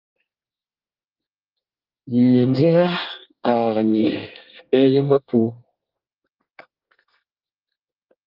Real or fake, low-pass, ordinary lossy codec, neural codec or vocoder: fake; 5.4 kHz; Opus, 24 kbps; codec, 24 kHz, 1 kbps, SNAC